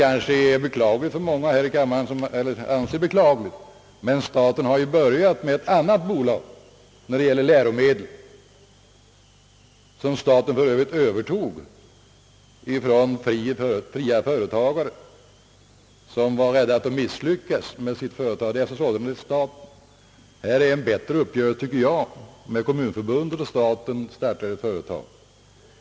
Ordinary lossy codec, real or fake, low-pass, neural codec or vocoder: none; real; none; none